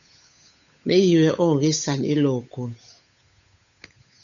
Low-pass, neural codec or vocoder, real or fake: 7.2 kHz; codec, 16 kHz, 8 kbps, FunCodec, trained on Chinese and English, 25 frames a second; fake